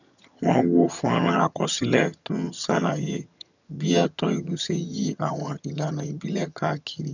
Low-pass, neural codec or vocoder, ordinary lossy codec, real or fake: 7.2 kHz; vocoder, 22.05 kHz, 80 mel bands, HiFi-GAN; none; fake